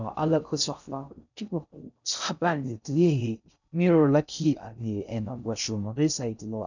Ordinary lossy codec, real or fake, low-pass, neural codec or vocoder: none; fake; 7.2 kHz; codec, 16 kHz in and 24 kHz out, 0.6 kbps, FocalCodec, streaming, 2048 codes